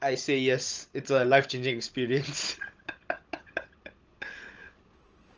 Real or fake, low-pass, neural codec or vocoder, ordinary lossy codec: fake; 7.2 kHz; codec, 16 kHz, 16 kbps, FunCodec, trained on Chinese and English, 50 frames a second; Opus, 24 kbps